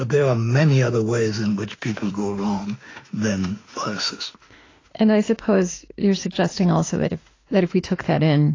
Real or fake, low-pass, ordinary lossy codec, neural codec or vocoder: fake; 7.2 kHz; AAC, 32 kbps; autoencoder, 48 kHz, 32 numbers a frame, DAC-VAE, trained on Japanese speech